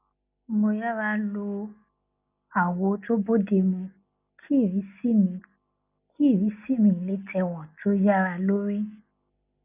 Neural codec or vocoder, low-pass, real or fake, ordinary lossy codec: none; 3.6 kHz; real; none